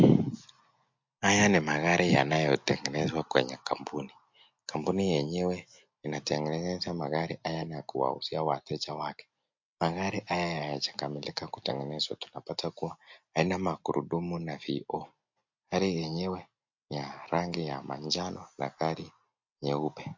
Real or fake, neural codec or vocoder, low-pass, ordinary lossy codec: real; none; 7.2 kHz; MP3, 48 kbps